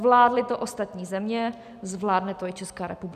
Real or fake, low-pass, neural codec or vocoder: real; 14.4 kHz; none